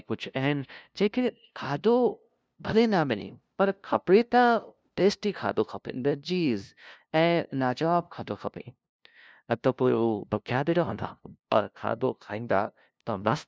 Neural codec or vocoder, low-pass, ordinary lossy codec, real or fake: codec, 16 kHz, 0.5 kbps, FunCodec, trained on LibriTTS, 25 frames a second; none; none; fake